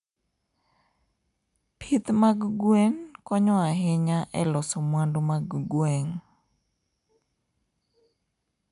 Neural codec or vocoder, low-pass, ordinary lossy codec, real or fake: none; 10.8 kHz; AAC, 96 kbps; real